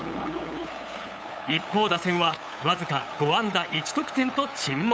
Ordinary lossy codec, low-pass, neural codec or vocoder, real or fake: none; none; codec, 16 kHz, 8 kbps, FunCodec, trained on LibriTTS, 25 frames a second; fake